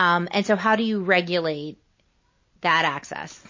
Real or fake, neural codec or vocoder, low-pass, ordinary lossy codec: real; none; 7.2 kHz; MP3, 32 kbps